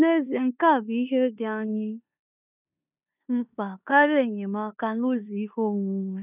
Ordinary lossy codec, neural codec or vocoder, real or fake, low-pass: none; codec, 16 kHz in and 24 kHz out, 0.9 kbps, LongCat-Audio-Codec, four codebook decoder; fake; 3.6 kHz